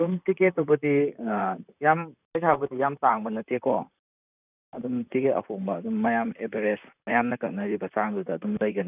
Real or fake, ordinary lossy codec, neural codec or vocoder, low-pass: fake; none; vocoder, 44.1 kHz, 128 mel bands, Pupu-Vocoder; 3.6 kHz